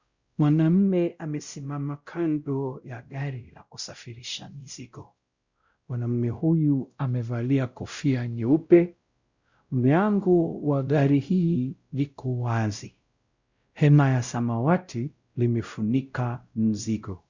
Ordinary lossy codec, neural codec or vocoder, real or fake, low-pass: Opus, 64 kbps; codec, 16 kHz, 0.5 kbps, X-Codec, WavLM features, trained on Multilingual LibriSpeech; fake; 7.2 kHz